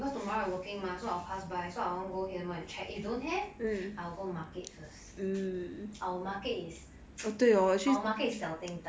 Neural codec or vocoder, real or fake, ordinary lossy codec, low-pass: none; real; none; none